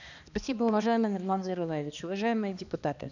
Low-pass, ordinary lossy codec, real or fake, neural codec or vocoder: 7.2 kHz; none; fake; codec, 16 kHz, 2 kbps, X-Codec, HuBERT features, trained on balanced general audio